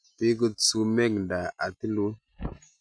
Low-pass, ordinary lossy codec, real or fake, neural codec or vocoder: 9.9 kHz; none; real; none